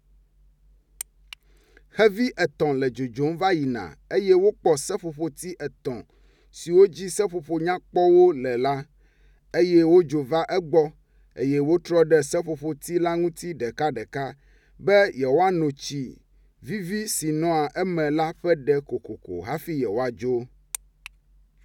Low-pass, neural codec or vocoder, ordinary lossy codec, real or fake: 19.8 kHz; none; none; real